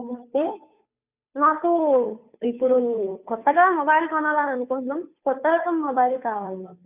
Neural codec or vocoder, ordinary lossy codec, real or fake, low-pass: codec, 16 kHz, 2 kbps, FunCodec, trained on Chinese and English, 25 frames a second; none; fake; 3.6 kHz